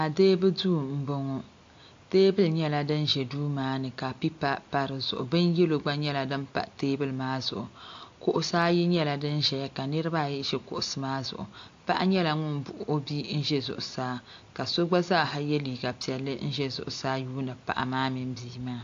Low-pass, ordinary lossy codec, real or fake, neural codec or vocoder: 7.2 kHz; AAC, 48 kbps; real; none